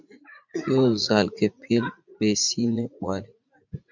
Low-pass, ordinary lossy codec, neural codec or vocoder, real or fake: 7.2 kHz; MP3, 64 kbps; vocoder, 44.1 kHz, 80 mel bands, Vocos; fake